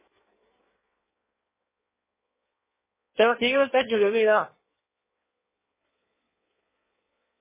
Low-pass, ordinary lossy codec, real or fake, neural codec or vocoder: 3.6 kHz; MP3, 16 kbps; fake; codec, 16 kHz in and 24 kHz out, 1.1 kbps, FireRedTTS-2 codec